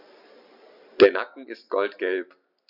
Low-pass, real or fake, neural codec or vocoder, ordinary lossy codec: 5.4 kHz; real; none; none